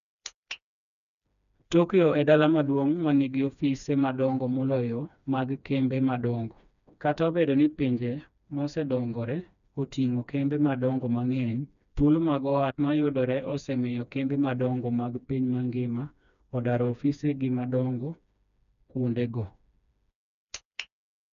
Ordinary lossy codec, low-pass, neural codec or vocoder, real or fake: none; 7.2 kHz; codec, 16 kHz, 2 kbps, FreqCodec, smaller model; fake